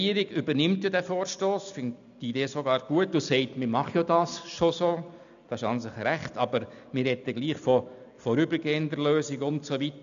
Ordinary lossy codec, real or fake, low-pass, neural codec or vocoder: none; real; 7.2 kHz; none